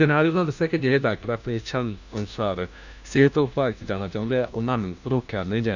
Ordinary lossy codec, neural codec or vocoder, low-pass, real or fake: none; codec, 16 kHz, 1 kbps, FunCodec, trained on LibriTTS, 50 frames a second; 7.2 kHz; fake